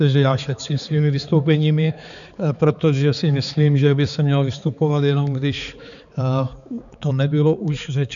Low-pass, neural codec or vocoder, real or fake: 7.2 kHz; codec, 16 kHz, 4 kbps, X-Codec, HuBERT features, trained on balanced general audio; fake